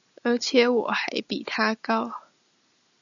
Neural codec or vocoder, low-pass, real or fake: none; 7.2 kHz; real